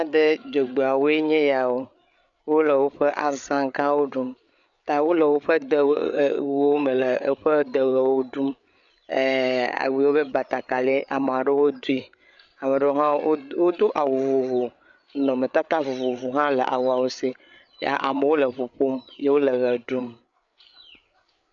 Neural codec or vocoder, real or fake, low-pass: codec, 16 kHz, 8 kbps, FreqCodec, larger model; fake; 7.2 kHz